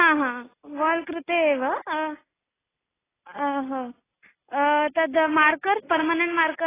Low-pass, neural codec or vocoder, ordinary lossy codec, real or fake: 3.6 kHz; none; AAC, 16 kbps; real